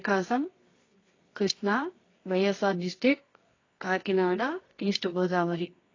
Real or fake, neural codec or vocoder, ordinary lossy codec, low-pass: fake; codec, 24 kHz, 0.9 kbps, WavTokenizer, medium music audio release; AAC, 32 kbps; 7.2 kHz